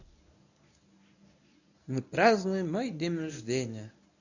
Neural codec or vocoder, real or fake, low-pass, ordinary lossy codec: codec, 24 kHz, 0.9 kbps, WavTokenizer, medium speech release version 1; fake; 7.2 kHz; none